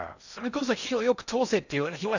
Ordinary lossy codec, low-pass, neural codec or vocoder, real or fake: none; 7.2 kHz; codec, 16 kHz in and 24 kHz out, 0.6 kbps, FocalCodec, streaming, 2048 codes; fake